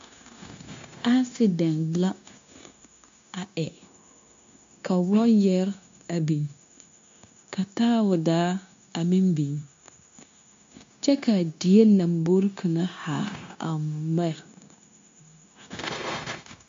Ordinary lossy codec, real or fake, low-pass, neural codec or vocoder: MP3, 48 kbps; fake; 7.2 kHz; codec, 16 kHz, 0.9 kbps, LongCat-Audio-Codec